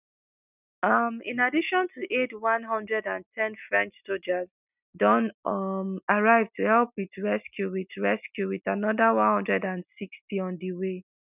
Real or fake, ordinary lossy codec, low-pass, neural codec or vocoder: real; none; 3.6 kHz; none